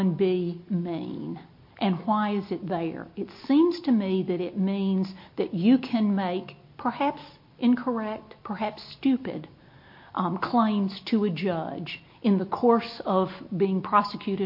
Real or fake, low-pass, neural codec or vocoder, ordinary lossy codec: real; 5.4 kHz; none; MP3, 32 kbps